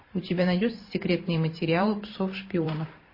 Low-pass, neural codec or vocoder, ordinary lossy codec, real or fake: 5.4 kHz; vocoder, 44.1 kHz, 128 mel bands every 512 samples, BigVGAN v2; MP3, 24 kbps; fake